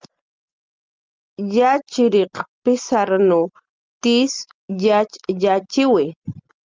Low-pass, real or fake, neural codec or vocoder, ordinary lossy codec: 7.2 kHz; real; none; Opus, 32 kbps